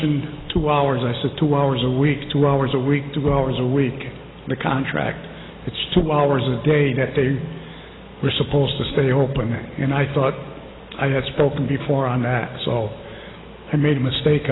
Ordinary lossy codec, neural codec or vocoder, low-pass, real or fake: AAC, 16 kbps; none; 7.2 kHz; real